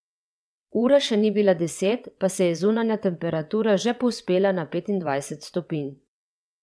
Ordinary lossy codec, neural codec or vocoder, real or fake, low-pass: none; vocoder, 22.05 kHz, 80 mel bands, WaveNeXt; fake; none